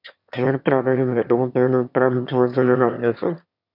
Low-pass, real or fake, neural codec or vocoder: 5.4 kHz; fake; autoencoder, 22.05 kHz, a latent of 192 numbers a frame, VITS, trained on one speaker